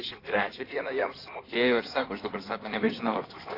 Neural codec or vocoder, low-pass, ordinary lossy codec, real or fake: codec, 16 kHz in and 24 kHz out, 2.2 kbps, FireRedTTS-2 codec; 5.4 kHz; AAC, 24 kbps; fake